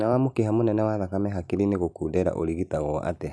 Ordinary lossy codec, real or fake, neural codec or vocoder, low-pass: none; real; none; none